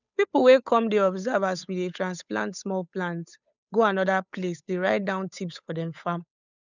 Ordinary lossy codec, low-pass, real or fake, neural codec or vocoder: none; 7.2 kHz; fake; codec, 16 kHz, 8 kbps, FunCodec, trained on Chinese and English, 25 frames a second